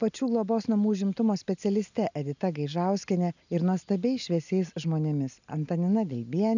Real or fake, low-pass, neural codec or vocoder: real; 7.2 kHz; none